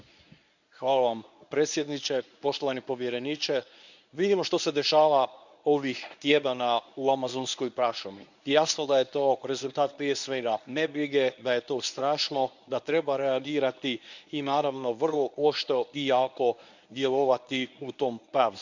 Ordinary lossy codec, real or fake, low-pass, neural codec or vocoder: none; fake; 7.2 kHz; codec, 24 kHz, 0.9 kbps, WavTokenizer, medium speech release version 1